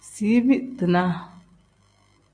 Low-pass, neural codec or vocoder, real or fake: 9.9 kHz; none; real